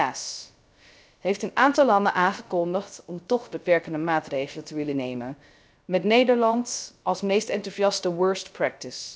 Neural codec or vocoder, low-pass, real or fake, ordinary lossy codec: codec, 16 kHz, 0.3 kbps, FocalCodec; none; fake; none